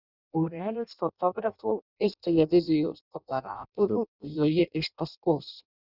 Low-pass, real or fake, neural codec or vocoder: 5.4 kHz; fake; codec, 16 kHz in and 24 kHz out, 0.6 kbps, FireRedTTS-2 codec